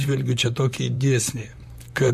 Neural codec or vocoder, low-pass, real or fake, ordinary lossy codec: vocoder, 44.1 kHz, 128 mel bands, Pupu-Vocoder; 14.4 kHz; fake; MP3, 64 kbps